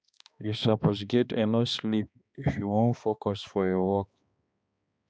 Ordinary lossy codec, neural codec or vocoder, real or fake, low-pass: none; codec, 16 kHz, 2 kbps, X-Codec, HuBERT features, trained on balanced general audio; fake; none